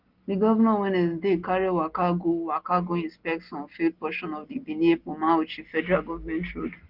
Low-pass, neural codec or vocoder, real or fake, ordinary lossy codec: 5.4 kHz; none; real; Opus, 16 kbps